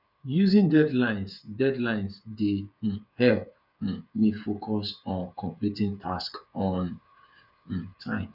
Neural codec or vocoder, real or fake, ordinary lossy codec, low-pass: codec, 16 kHz, 8 kbps, FreqCodec, smaller model; fake; none; 5.4 kHz